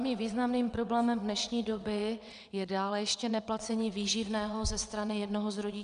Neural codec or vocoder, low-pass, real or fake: vocoder, 22.05 kHz, 80 mel bands, WaveNeXt; 9.9 kHz; fake